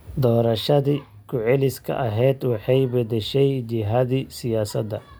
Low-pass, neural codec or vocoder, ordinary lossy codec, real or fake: none; none; none; real